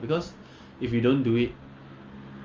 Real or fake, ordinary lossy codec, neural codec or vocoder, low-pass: real; Opus, 32 kbps; none; 7.2 kHz